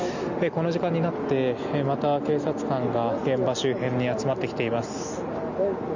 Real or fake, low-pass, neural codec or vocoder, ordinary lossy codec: real; 7.2 kHz; none; none